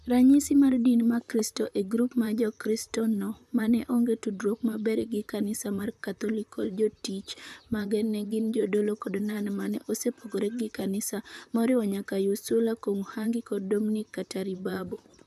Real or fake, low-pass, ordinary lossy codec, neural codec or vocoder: fake; 14.4 kHz; none; vocoder, 44.1 kHz, 128 mel bands, Pupu-Vocoder